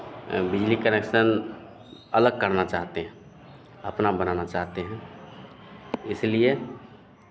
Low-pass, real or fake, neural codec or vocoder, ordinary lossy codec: none; real; none; none